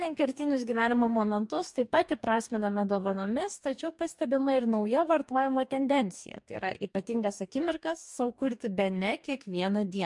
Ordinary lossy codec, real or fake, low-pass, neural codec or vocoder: MP3, 64 kbps; fake; 10.8 kHz; codec, 44.1 kHz, 2.6 kbps, DAC